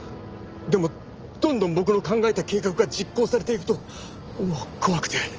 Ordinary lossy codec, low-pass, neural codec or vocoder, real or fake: Opus, 24 kbps; 7.2 kHz; none; real